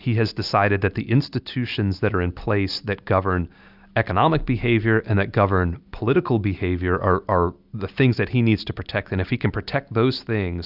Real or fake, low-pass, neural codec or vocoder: real; 5.4 kHz; none